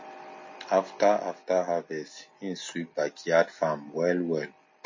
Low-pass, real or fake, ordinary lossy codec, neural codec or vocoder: 7.2 kHz; real; MP3, 32 kbps; none